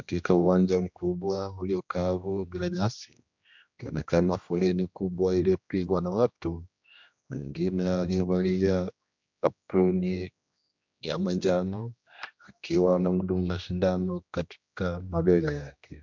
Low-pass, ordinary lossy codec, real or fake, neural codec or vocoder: 7.2 kHz; MP3, 64 kbps; fake; codec, 16 kHz, 1 kbps, X-Codec, HuBERT features, trained on general audio